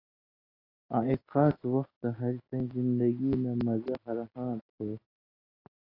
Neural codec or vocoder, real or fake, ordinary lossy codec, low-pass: none; real; AAC, 24 kbps; 5.4 kHz